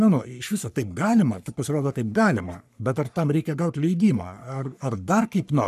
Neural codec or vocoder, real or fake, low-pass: codec, 44.1 kHz, 3.4 kbps, Pupu-Codec; fake; 14.4 kHz